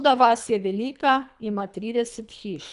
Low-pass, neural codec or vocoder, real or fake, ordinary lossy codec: 10.8 kHz; codec, 24 kHz, 3 kbps, HILCodec; fake; none